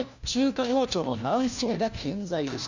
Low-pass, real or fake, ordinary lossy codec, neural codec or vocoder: 7.2 kHz; fake; none; codec, 16 kHz, 1 kbps, FunCodec, trained on LibriTTS, 50 frames a second